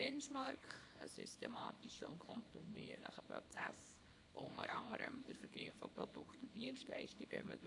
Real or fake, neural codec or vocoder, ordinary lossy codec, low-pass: fake; codec, 24 kHz, 0.9 kbps, WavTokenizer, small release; AAC, 64 kbps; 10.8 kHz